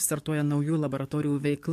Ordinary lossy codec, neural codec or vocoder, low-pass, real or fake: MP3, 64 kbps; codec, 44.1 kHz, 7.8 kbps, DAC; 14.4 kHz; fake